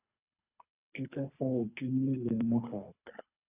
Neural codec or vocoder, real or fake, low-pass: codec, 24 kHz, 3 kbps, HILCodec; fake; 3.6 kHz